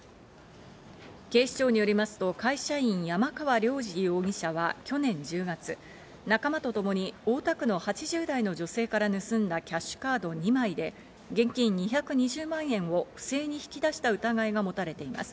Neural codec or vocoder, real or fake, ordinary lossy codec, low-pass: none; real; none; none